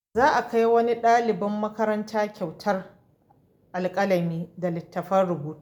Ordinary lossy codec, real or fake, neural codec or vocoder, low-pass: none; real; none; 19.8 kHz